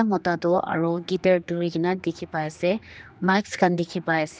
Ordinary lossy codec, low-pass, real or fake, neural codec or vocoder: none; none; fake; codec, 16 kHz, 2 kbps, X-Codec, HuBERT features, trained on general audio